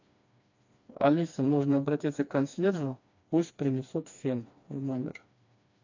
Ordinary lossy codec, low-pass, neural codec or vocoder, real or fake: AAC, 48 kbps; 7.2 kHz; codec, 16 kHz, 2 kbps, FreqCodec, smaller model; fake